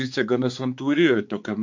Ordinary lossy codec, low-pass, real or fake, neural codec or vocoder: MP3, 48 kbps; 7.2 kHz; fake; codec, 16 kHz, 4 kbps, X-Codec, HuBERT features, trained on balanced general audio